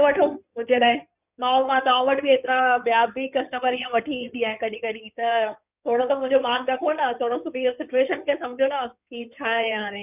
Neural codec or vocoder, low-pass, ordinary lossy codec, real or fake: codec, 16 kHz in and 24 kHz out, 2.2 kbps, FireRedTTS-2 codec; 3.6 kHz; none; fake